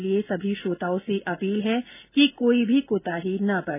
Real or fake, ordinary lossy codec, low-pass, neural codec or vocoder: real; none; 3.6 kHz; none